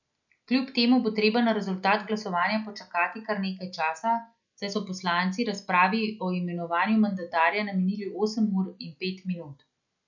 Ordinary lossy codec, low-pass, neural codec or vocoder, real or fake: none; 7.2 kHz; none; real